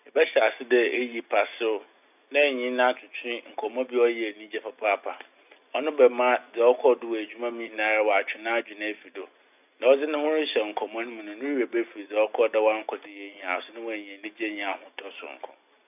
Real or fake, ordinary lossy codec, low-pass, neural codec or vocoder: real; none; 3.6 kHz; none